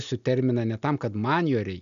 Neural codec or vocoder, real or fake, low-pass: none; real; 7.2 kHz